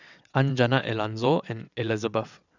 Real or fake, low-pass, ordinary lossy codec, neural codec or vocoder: fake; 7.2 kHz; none; vocoder, 22.05 kHz, 80 mel bands, WaveNeXt